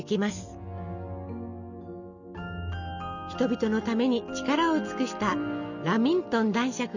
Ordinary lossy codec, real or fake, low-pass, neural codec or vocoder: none; real; 7.2 kHz; none